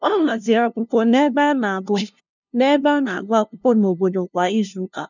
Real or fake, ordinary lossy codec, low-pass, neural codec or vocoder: fake; none; 7.2 kHz; codec, 16 kHz, 0.5 kbps, FunCodec, trained on LibriTTS, 25 frames a second